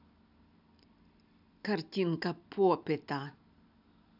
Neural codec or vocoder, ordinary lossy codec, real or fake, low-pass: none; none; real; 5.4 kHz